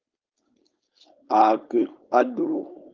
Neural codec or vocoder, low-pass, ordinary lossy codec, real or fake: codec, 16 kHz, 4.8 kbps, FACodec; 7.2 kHz; Opus, 24 kbps; fake